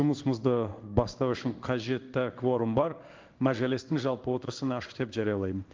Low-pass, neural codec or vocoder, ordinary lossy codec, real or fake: 7.2 kHz; codec, 16 kHz in and 24 kHz out, 1 kbps, XY-Tokenizer; Opus, 32 kbps; fake